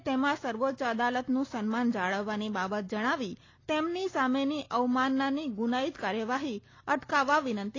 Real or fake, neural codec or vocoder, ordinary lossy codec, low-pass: real; none; AAC, 32 kbps; 7.2 kHz